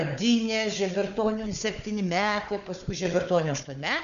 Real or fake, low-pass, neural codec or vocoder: fake; 7.2 kHz; codec, 16 kHz, 4 kbps, FunCodec, trained on Chinese and English, 50 frames a second